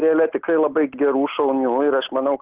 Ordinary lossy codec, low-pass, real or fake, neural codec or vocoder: Opus, 16 kbps; 3.6 kHz; real; none